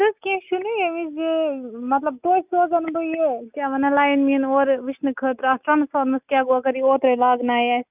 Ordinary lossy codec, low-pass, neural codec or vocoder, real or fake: none; 3.6 kHz; none; real